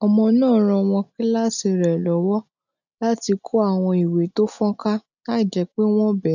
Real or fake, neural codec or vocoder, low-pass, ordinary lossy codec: real; none; 7.2 kHz; none